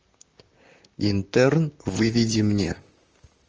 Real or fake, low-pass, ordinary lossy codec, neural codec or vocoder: fake; 7.2 kHz; Opus, 24 kbps; vocoder, 44.1 kHz, 128 mel bands, Pupu-Vocoder